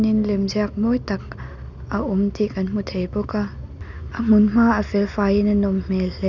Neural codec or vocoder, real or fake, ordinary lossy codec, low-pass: none; real; none; 7.2 kHz